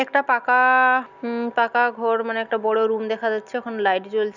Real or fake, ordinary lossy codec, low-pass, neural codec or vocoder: real; none; 7.2 kHz; none